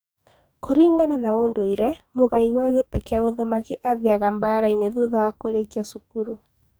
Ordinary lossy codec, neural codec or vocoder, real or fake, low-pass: none; codec, 44.1 kHz, 2.6 kbps, DAC; fake; none